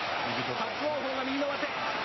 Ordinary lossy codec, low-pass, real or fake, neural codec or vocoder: MP3, 24 kbps; 7.2 kHz; real; none